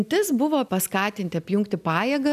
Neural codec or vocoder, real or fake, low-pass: none; real; 14.4 kHz